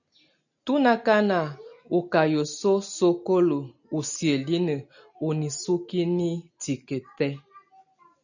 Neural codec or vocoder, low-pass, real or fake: none; 7.2 kHz; real